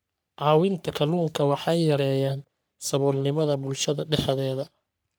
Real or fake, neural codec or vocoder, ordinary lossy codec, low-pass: fake; codec, 44.1 kHz, 3.4 kbps, Pupu-Codec; none; none